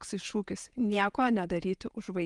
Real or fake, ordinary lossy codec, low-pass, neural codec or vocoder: fake; Opus, 32 kbps; 10.8 kHz; vocoder, 48 kHz, 128 mel bands, Vocos